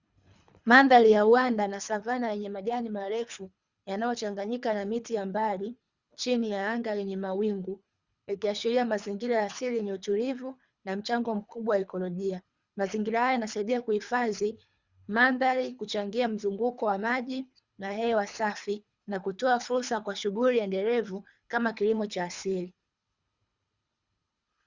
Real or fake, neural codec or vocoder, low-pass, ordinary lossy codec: fake; codec, 24 kHz, 3 kbps, HILCodec; 7.2 kHz; Opus, 64 kbps